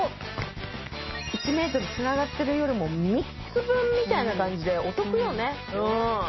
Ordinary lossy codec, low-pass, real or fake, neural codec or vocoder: MP3, 24 kbps; 7.2 kHz; real; none